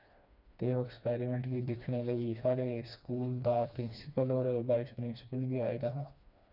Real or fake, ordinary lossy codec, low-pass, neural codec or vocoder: fake; none; 5.4 kHz; codec, 16 kHz, 2 kbps, FreqCodec, smaller model